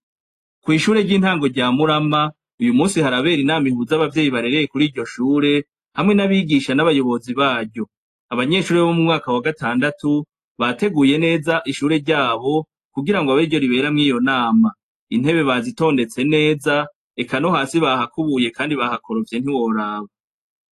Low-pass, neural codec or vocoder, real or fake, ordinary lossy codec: 14.4 kHz; none; real; AAC, 48 kbps